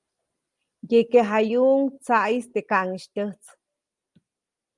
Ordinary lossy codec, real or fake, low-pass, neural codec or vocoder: Opus, 32 kbps; real; 10.8 kHz; none